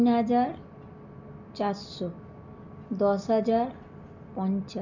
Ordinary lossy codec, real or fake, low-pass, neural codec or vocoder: none; real; 7.2 kHz; none